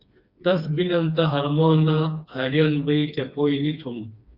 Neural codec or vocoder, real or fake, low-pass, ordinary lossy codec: codec, 16 kHz, 2 kbps, FreqCodec, smaller model; fake; 5.4 kHz; Opus, 64 kbps